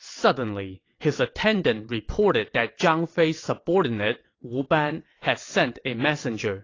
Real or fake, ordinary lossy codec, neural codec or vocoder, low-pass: fake; AAC, 32 kbps; vocoder, 22.05 kHz, 80 mel bands, WaveNeXt; 7.2 kHz